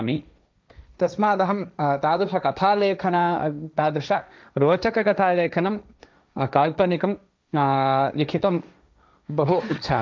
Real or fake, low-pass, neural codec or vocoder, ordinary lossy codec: fake; none; codec, 16 kHz, 1.1 kbps, Voila-Tokenizer; none